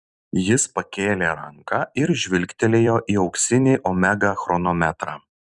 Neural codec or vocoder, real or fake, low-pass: vocoder, 44.1 kHz, 128 mel bands every 256 samples, BigVGAN v2; fake; 10.8 kHz